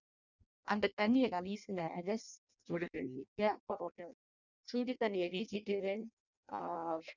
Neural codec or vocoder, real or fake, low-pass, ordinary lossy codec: codec, 16 kHz in and 24 kHz out, 0.6 kbps, FireRedTTS-2 codec; fake; 7.2 kHz; none